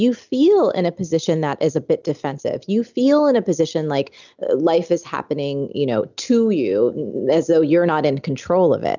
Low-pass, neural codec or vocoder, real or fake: 7.2 kHz; none; real